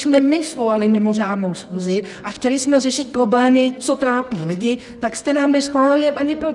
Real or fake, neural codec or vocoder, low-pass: fake; codec, 24 kHz, 0.9 kbps, WavTokenizer, medium music audio release; 10.8 kHz